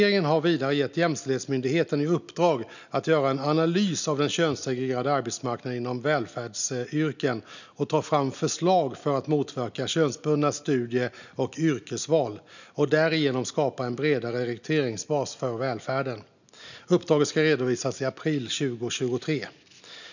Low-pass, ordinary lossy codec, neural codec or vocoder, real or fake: 7.2 kHz; none; none; real